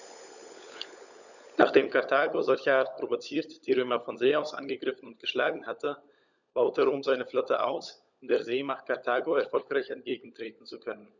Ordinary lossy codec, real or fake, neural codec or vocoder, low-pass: none; fake; codec, 16 kHz, 16 kbps, FunCodec, trained on LibriTTS, 50 frames a second; 7.2 kHz